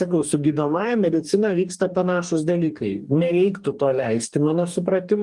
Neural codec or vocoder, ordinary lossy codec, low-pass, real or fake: codec, 44.1 kHz, 2.6 kbps, DAC; Opus, 32 kbps; 10.8 kHz; fake